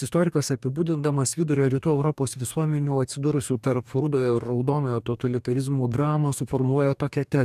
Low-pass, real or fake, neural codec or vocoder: 14.4 kHz; fake; codec, 44.1 kHz, 2.6 kbps, DAC